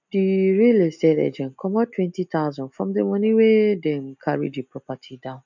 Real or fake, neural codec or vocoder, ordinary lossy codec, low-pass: real; none; none; 7.2 kHz